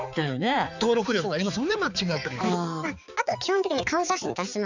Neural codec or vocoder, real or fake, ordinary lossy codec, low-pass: codec, 16 kHz, 4 kbps, X-Codec, HuBERT features, trained on balanced general audio; fake; none; 7.2 kHz